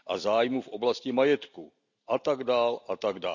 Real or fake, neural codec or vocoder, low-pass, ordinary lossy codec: real; none; 7.2 kHz; none